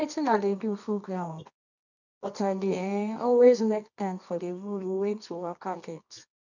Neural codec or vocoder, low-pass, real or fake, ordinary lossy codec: codec, 24 kHz, 0.9 kbps, WavTokenizer, medium music audio release; 7.2 kHz; fake; none